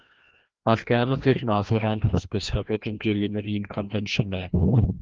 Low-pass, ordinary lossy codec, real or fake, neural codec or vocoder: 7.2 kHz; Opus, 32 kbps; fake; codec, 16 kHz, 1 kbps, FreqCodec, larger model